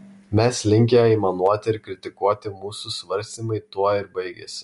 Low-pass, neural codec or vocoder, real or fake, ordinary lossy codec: 10.8 kHz; none; real; MP3, 64 kbps